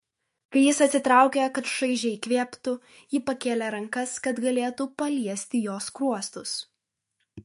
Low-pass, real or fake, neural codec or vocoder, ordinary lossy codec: 14.4 kHz; fake; autoencoder, 48 kHz, 128 numbers a frame, DAC-VAE, trained on Japanese speech; MP3, 48 kbps